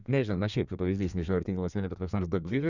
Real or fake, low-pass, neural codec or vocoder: fake; 7.2 kHz; codec, 32 kHz, 1.9 kbps, SNAC